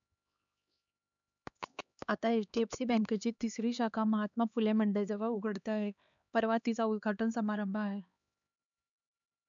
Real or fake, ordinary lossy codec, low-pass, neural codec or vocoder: fake; none; 7.2 kHz; codec, 16 kHz, 2 kbps, X-Codec, HuBERT features, trained on LibriSpeech